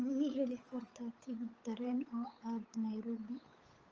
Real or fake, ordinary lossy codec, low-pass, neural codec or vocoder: fake; Opus, 24 kbps; 7.2 kHz; codec, 16 kHz, 8 kbps, FunCodec, trained on Chinese and English, 25 frames a second